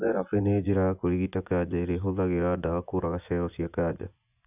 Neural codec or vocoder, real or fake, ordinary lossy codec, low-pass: vocoder, 24 kHz, 100 mel bands, Vocos; fake; none; 3.6 kHz